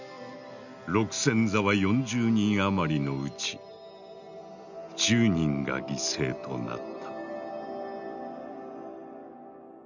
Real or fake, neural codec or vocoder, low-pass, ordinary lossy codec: real; none; 7.2 kHz; none